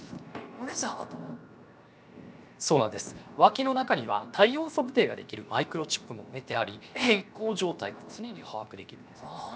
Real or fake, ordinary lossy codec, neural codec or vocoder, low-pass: fake; none; codec, 16 kHz, 0.7 kbps, FocalCodec; none